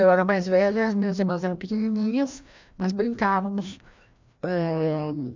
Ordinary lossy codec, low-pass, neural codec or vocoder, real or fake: MP3, 64 kbps; 7.2 kHz; codec, 16 kHz, 1 kbps, FreqCodec, larger model; fake